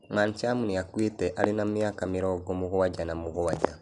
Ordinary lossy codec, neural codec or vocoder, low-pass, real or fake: none; none; 10.8 kHz; real